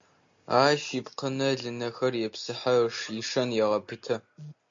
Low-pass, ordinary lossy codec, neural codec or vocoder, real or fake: 7.2 kHz; MP3, 48 kbps; none; real